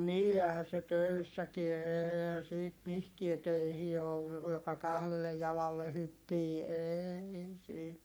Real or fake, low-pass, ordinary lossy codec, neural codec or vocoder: fake; none; none; codec, 44.1 kHz, 3.4 kbps, Pupu-Codec